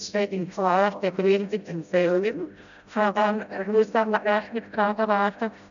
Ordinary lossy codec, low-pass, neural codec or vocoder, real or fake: none; 7.2 kHz; codec, 16 kHz, 0.5 kbps, FreqCodec, smaller model; fake